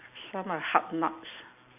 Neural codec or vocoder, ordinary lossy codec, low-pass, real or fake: none; none; 3.6 kHz; real